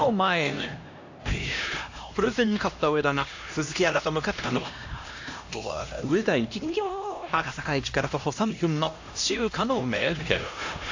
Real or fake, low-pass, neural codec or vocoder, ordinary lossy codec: fake; 7.2 kHz; codec, 16 kHz, 1 kbps, X-Codec, HuBERT features, trained on LibriSpeech; AAC, 48 kbps